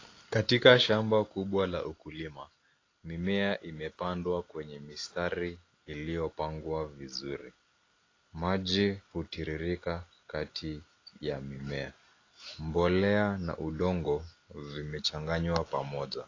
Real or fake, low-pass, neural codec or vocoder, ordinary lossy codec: real; 7.2 kHz; none; AAC, 32 kbps